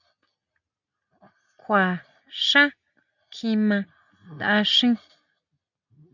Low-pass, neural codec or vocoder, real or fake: 7.2 kHz; none; real